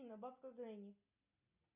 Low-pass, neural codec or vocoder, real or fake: 3.6 kHz; none; real